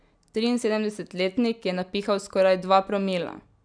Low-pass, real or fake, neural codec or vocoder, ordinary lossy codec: 9.9 kHz; real; none; none